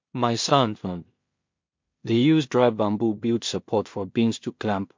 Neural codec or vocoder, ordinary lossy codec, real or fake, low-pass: codec, 16 kHz in and 24 kHz out, 0.4 kbps, LongCat-Audio-Codec, two codebook decoder; MP3, 48 kbps; fake; 7.2 kHz